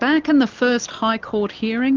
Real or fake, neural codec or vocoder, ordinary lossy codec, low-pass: real; none; Opus, 24 kbps; 7.2 kHz